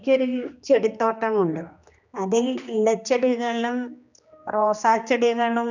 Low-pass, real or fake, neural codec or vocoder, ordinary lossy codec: 7.2 kHz; fake; codec, 16 kHz, 2 kbps, X-Codec, HuBERT features, trained on general audio; none